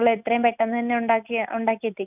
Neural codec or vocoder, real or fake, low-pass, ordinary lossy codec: none; real; 3.6 kHz; none